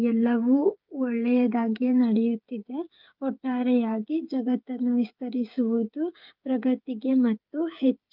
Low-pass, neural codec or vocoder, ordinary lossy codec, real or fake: 5.4 kHz; codec, 16 kHz, 8 kbps, FreqCodec, smaller model; Opus, 24 kbps; fake